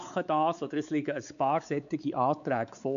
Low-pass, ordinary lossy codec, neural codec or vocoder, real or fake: 7.2 kHz; none; codec, 16 kHz, 4 kbps, X-Codec, WavLM features, trained on Multilingual LibriSpeech; fake